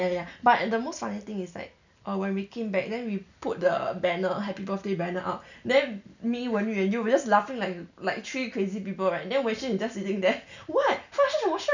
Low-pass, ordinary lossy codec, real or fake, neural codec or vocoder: 7.2 kHz; none; real; none